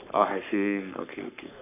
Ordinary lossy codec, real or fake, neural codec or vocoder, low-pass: none; fake; codec, 44.1 kHz, 3.4 kbps, Pupu-Codec; 3.6 kHz